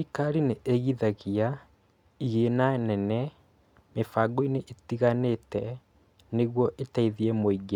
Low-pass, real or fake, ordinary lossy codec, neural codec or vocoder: 19.8 kHz; real; none; none